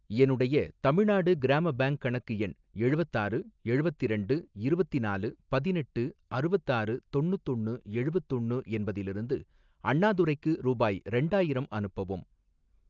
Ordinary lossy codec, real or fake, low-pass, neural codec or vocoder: Opus, 24 kbps; real; 7.2 kHz; none